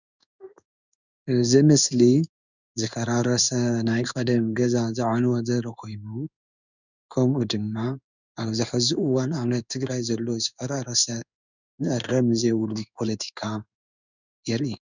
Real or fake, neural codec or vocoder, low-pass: fake; codec, 16 kHz in and 24 kHz out, 1 kbps, XY-Tokenizer; 7.2 kHz